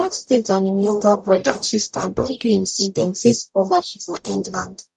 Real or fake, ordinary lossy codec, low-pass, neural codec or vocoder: fake; none; 10.8 kHz; codec, 44.1 kHz, 0.9 kbps, DAC